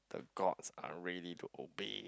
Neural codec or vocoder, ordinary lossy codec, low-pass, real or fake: none; none; none; real